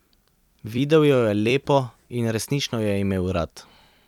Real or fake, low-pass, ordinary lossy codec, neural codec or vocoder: fake; 19.8 kHz; none; vocoder, 44.1 kHz, 128 mel bands every 256 samples, BigVGAN v2